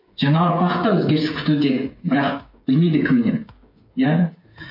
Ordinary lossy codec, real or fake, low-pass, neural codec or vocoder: MP3, 32 kbps; fake; 5.4 kHz; codec, 16 kHz, 8 kbps, FreqCodec, smaller model